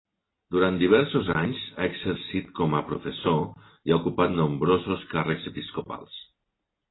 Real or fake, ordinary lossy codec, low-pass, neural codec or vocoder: real; AAC, 16 kbps; 7.2 kHz; none